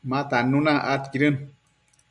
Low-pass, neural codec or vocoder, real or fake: 10.8 kHz; none; real